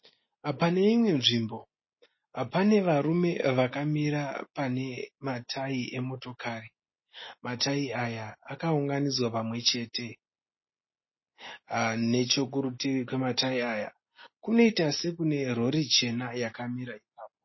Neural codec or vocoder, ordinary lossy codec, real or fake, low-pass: none; MP3, 24 kbps; real; 7.2 kHz